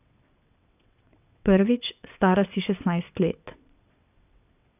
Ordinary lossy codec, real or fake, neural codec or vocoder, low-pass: none; fake; vocoder, 22.05 kHz, 80 mel bands, WaveNeXt; 3.6 kHz